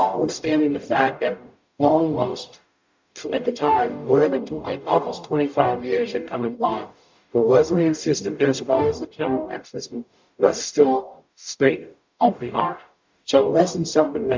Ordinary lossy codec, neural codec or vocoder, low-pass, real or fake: MP3, 64 kbps; codec, 44.1 kHz, 0.9 kbps, DAC; 7.2 kHz; fake